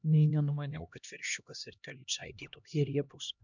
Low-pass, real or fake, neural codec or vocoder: 7.2 kHz; fake; codec, 16 kHz, 1 kbps, X-Codec, HuBERT features, trained on LibriSpeech